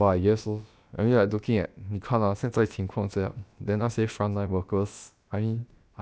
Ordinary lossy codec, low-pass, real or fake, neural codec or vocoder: none; none; fake; codec, 16 kHz, about 1 kbps, DyCAST, with the encoder's durations